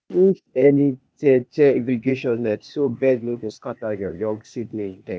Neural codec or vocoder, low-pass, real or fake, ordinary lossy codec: codec, 16 kHz, 0.8 kbps, ZipCodec; none; fake; none